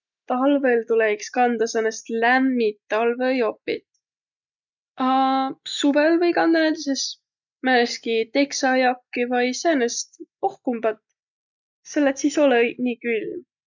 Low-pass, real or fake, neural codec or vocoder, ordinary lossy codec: 7.2 kHz; real; none; none